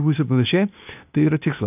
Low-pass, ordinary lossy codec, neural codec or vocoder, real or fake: 3.6 kHz; AAC, 32 kbps; codec, 16 kHz, 0.8 kbps, ZipCodec; fake